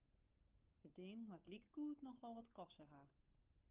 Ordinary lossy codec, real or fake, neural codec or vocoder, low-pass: Opus, 24 kbps; fake; codec, 16 kHz, 8 kbps, FunCodec, trained on Chinese and English, 25 frames a second; 3.6 kHz